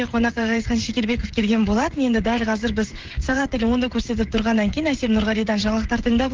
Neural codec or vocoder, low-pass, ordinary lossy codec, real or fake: codec, 16 kHz, 16 kbps, FreqCodec, smaller model; 7.2 kHz; Opus, 16 kbps; fake